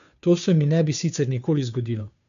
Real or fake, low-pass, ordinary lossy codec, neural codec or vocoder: fake; 7.2 kHz; none; codec, 16 kHz, 2 kbps, FunCodec, trained on Chinese and English, 25 frames a second